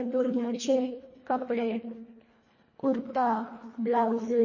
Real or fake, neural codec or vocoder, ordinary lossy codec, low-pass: fake; codec, 24 kHz, 1.5 kbps, HILCodec; MP3, 32 kbps; 7.2 kHz